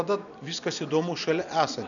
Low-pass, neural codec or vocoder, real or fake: 7.2 kHz; none; real